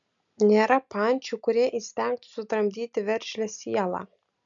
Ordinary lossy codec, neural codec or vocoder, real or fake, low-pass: MP3, 64 kbps; none; real; 7.2 kHz